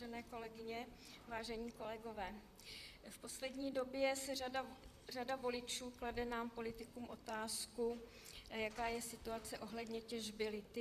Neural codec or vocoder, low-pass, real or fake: vocoder, 44.1 kHz, 128 mel bands, Pupu-Vocoder; 14.4 kHz; fake